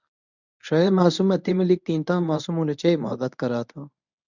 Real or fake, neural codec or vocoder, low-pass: fake; codec, 24 kHz, 0.9 kbps, WavTokenizer, medium speech release version 1; 7.2 kHz